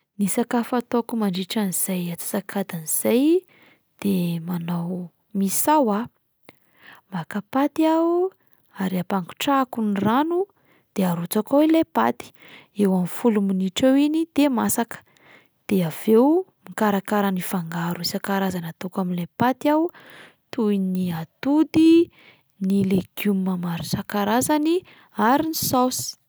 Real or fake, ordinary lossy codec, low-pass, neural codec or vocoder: real; none; none; none